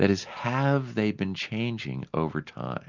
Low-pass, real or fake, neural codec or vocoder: 7.2 kHz; real; none